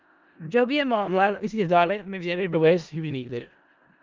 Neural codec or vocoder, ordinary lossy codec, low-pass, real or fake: codec, 16 kHz in and 24 kHz out, 0.4 kbps, LongCat-Audio-Codec, four codebook decoder; Opus, 24 kbps; 7.2 kHz; fake